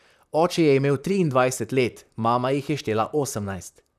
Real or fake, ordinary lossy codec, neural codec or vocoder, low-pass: fake; none; vocoder, 44.1 kHz, 128 mel bands, Pupu-Vocoder; 14.4 kHz